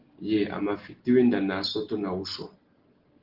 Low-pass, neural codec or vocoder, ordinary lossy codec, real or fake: 5.4 kHz; none; Opus, 16 kbps; real